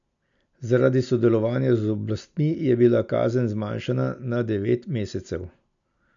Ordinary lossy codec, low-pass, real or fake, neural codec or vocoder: none; 7.2 kHz; real; none